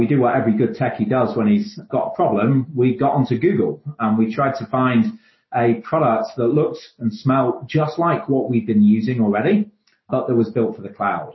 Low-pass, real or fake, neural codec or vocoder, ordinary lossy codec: 7.2 kHz; real; none; MP3, 24 kbps